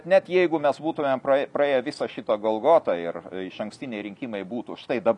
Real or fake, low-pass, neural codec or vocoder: real; 10.8 kHz; none